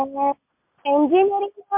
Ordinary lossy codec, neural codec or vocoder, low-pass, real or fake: MP3, 32 kbps; none; 3.6 kHz; real